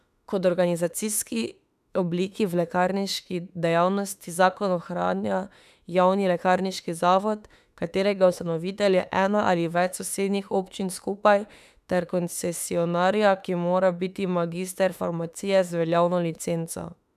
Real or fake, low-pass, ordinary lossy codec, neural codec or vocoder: fake; 14.4 kHz; none; autoencoder, 48 kHz, 32 numbers a frame, DAC-VAE, trained on Japanese speech